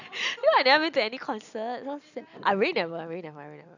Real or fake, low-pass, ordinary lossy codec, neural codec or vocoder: real; 7.2 kHz; none; none